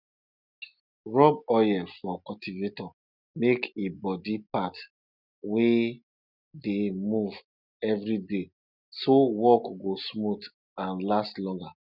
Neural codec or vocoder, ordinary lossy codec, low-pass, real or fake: none; none; 5.4 kHz; real